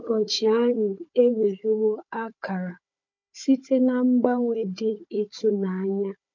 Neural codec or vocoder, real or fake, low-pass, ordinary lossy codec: codec, 16 kHz, 4 kbps, FunCodec, trained on Chinese and English, 50 frames a second; fake; 7.2 kHz; MP3, 48 kbps